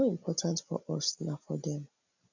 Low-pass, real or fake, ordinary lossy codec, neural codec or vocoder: 7.2 kHz; real; none; none